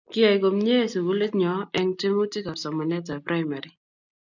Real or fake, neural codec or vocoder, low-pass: real; none; 7.2 kHz